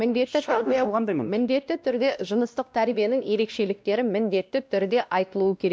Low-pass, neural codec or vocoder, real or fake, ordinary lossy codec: none; codec, 16 kHz, 1 kbps, X-Codec, WavLM features, trained on Multilingual LibriSpeech; fake; none